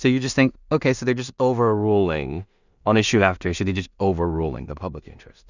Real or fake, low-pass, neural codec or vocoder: fake; 7.2 kHz; codec, 16 kHz in and 24 kHz out, 0.4 kbps, LongCat-Audio-Codec, two codebook decoder